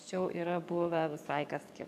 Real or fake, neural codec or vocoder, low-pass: fake; codec, 44.1 kHz, 7.8 kbps, DAC; 14.4 kHz